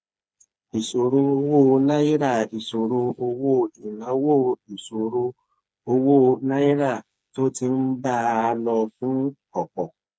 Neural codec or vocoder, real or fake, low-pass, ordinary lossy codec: codec, 16 kHz, 4 kbps, FreqCodec, smaller model; fake; none; none